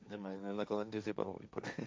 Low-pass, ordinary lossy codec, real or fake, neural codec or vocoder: none; none; fake; codec, 16 kHz, 1.1 kbps, Voila-Tokenizer